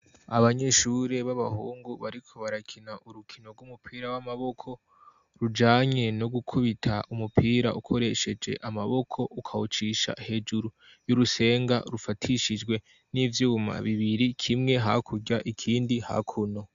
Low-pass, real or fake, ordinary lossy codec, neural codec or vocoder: 7.2 kHz; real; AAC, 96 kbps; none